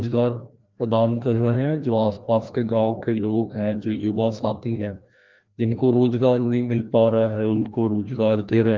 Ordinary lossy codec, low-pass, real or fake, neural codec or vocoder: Opus, 32 kbps; 7.2 kHz; fake; codec, 16 kHz, 1 kbps, FreqCodec, larger model